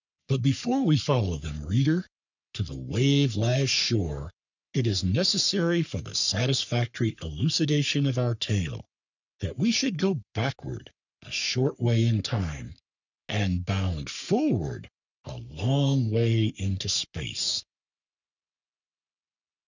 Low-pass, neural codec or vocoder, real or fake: 7.2 kHz; codec, 44.1 kHz, 3.4 kbps, Pupu-Codec; fake